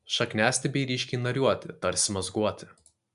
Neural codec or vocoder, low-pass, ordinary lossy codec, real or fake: none; 10.8 kHz; MP3, 96 kbps; real